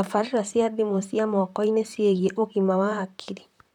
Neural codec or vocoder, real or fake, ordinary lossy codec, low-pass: vocoder, 44.1 kHz, 128 mel bands, Pupu-Vocoder; fake; none; 19.8 kHz